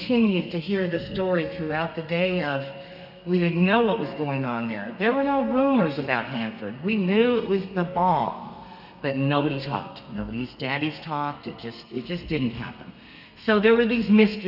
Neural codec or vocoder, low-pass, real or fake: codec, 32 kHz, 1.9 kbps, SNAC; 5.4 kHz; fake